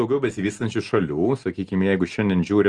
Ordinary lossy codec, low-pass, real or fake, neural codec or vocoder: Opus, 24 kbps; 10.8 kHz; real; none